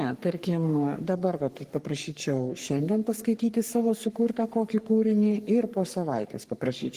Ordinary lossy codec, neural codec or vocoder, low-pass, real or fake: Opus, 24 kbps; codec, 44.1 kHz, 3.4 kbps, Pupu-Codec; 14.4 kHz; fake